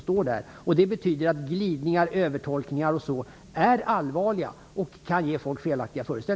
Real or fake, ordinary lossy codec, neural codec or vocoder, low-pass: real; none; none; none